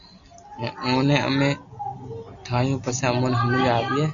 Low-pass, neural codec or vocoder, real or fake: 7.2 kHz; none; real